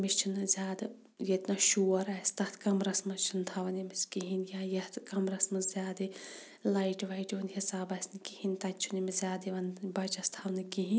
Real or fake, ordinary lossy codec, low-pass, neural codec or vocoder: real; none; none; none